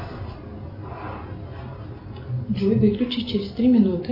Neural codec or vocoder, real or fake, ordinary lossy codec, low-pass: none; real; AAC, 32 kbps; 5.4 kHz